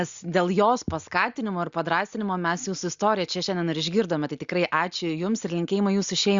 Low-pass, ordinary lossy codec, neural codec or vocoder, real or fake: 7.2 kHz; Opus, 64 kbps; none; real